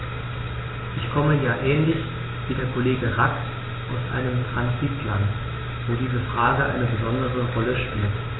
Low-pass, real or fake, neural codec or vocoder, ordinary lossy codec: 7.2 kHz; real; none; AAC, 16 kbps